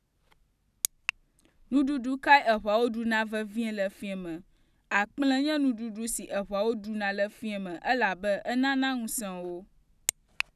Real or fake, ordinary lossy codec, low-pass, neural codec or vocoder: real; none; 14.4 kHz; none